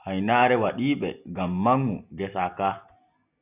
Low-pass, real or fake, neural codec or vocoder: 3.6 kHz; real; none